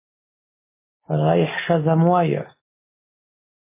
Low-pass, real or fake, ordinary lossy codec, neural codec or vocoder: 3.6 kHz; real; MP3, 16 kbps; none